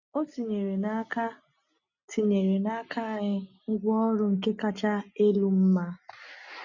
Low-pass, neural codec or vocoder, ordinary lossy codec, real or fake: 7.2 kHz; none; none; real